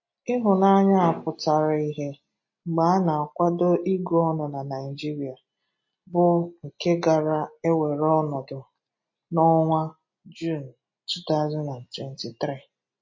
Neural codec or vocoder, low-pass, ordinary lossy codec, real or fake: none; 7.2 kHz; MP3, 32 kbps; real